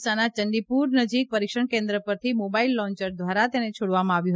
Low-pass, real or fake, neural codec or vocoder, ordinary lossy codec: none; real; none; none